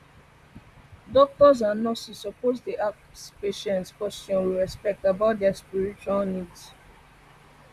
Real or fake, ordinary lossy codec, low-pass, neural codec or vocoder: fake; none; 14.4 kHz; vocoder, 44.1 kHz, 128 mel bands, Pupu-Vocoder